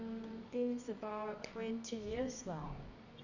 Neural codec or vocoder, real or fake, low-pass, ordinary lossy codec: codec, 24 kHz, 0.9 kbps, WavTokenizer, medium music audio release; fake; 7.2 kHz; none